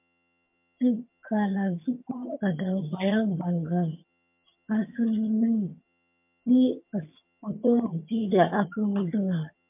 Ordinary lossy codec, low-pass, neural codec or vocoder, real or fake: MP3, 32 kbps; 3.6 kHz; vocoder, 22.05 kHz, 80 mel bands, HiFi-GAN; fake